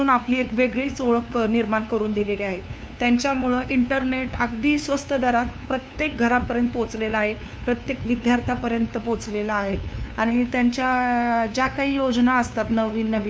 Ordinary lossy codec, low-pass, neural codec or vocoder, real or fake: none; none; codec, 16 kHz, 2 kbps, FunCodec, trained on LibriTTS, 25 frames a second; fake